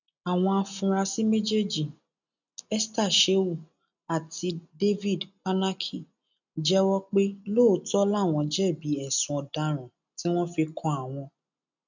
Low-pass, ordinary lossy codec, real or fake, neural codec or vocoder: 7.2 kHz; none; real; none